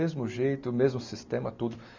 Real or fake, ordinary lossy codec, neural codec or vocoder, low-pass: real; MP3, 64 kbps; none; 7.2 kHz